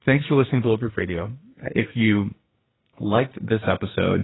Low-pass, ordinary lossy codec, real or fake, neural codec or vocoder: 7.2 kHz; AAC, 16 kbps; fake; codec, 44.1 kHz, 2.6 kbps, SNAC